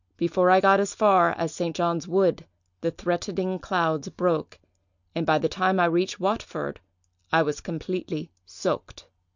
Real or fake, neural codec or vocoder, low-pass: real; none; 7.2 kHz